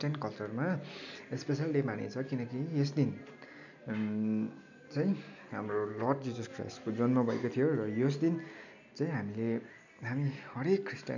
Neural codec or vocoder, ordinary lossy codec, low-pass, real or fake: none; none; 7.2 kHz; real